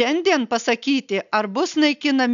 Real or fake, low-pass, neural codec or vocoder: real; 7.2 kHz; none